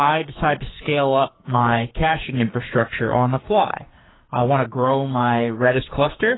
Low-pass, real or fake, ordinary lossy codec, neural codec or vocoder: 7.2 kHz; fake; AAC, 16 kbps; codec, 44.1 kHz, 2.6 kbps, SNAC